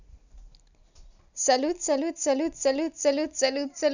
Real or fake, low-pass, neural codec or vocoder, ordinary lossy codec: real; 7.2 kHz; none; none